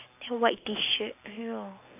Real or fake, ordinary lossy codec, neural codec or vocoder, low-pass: real; AAC, 24 kbps; none; 3.6 kHz